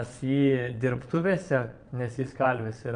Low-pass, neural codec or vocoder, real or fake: 9.9 kHz; vocoder, 22.05 kHz, 80 mel bands, Vocos; fake